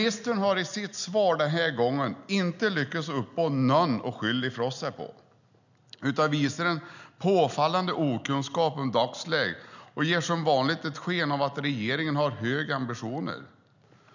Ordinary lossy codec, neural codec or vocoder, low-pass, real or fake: none; none; 7.2 kHz; real